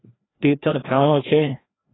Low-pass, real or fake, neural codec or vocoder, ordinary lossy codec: 7.2 kHz; fake; codec, 16 kHz, 1 kbps, FreqCodec, larger model; AAC, 16 kbps